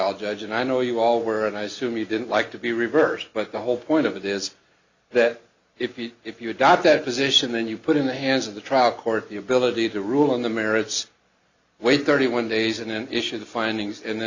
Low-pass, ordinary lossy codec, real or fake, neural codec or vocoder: 7.2 kHz; Opus, 64 kbps; real; none